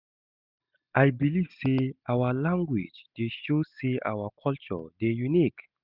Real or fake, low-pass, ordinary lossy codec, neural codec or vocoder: real; 5.4 kHz; none; none